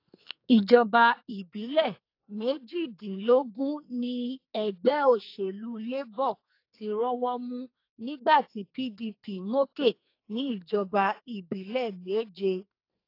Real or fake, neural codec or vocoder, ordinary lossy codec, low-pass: fake; codec, 44.1 kHz, 2.6 kbps, SNAC; AAC, 32 kbps; 5.4 kHz